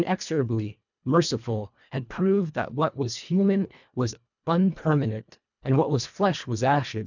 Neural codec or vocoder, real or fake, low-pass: codec, 24 kHz, 1.5 kbps, HILCodec; fake; 7.2 kHz